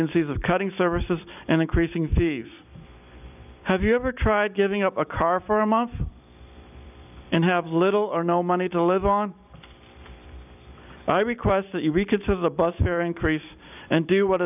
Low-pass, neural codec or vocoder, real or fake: 3.6 kHz; vocoder, 44.1 kHz, 128 mel bands every 256 samples, BigVGAN v2; fake